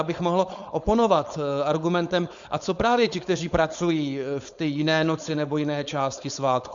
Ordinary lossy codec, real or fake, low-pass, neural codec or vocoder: Opus, 64 kbps; fake; 7.2 kHz; codec, 16 kHz, 4.8 kbps, FACodec